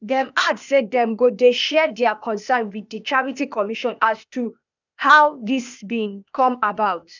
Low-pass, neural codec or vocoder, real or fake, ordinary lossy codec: 7.2 kHz; codec, 16 kHz, 0.8 kbps, ZipCodec; fake; none